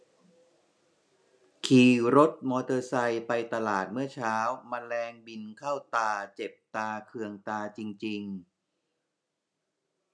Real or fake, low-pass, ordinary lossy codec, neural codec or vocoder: real; none; none; none